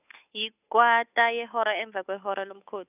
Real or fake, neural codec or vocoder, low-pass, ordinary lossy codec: fake; codec, 16 kHz, 8 kbps, FunCodec, trained on Chinese and English, 25 frames a second; 3.6 kHz; none